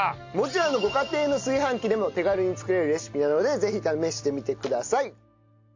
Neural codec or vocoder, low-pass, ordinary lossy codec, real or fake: none; 7.2 kHz; AAC, 48 kbps; real